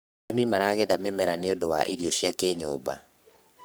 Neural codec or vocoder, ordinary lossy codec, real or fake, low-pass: codec, 44.1 kHz, 3.4 kbps, Pupu-Codec; none; fake; none